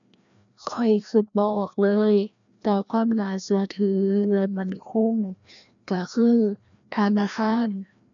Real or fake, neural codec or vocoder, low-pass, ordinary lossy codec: fake; codec, 16 kHz, 1 kbps, FreqCodec, larger model; 7.2 kHz; MP3, 96 kbps